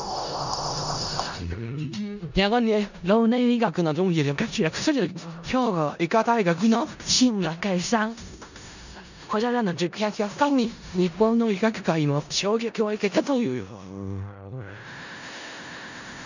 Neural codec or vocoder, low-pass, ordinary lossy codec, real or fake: codec, 16 kHz in and 24 kHz out, 0.4 kbps, LongCat-Audio-Codec, four codebook decoder; 7.2 kHz; none; fake